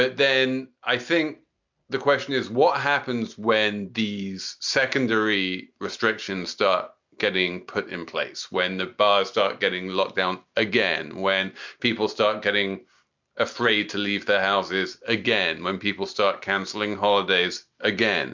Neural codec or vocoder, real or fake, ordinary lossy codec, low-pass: none; real; MP3, 64 kbps; 7.2 kHz